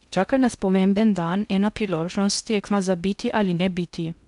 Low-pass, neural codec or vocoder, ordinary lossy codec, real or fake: 10.8 kHz; codec, 16 kHz in and 24 kHz out, 0.6 kbps, FocalCodec, streaming, 2048 codes; none; fake